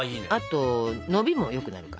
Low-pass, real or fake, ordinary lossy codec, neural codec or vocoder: none; real; none; none